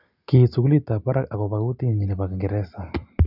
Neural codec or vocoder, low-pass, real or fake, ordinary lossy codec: none; 5.4 kHz; real; none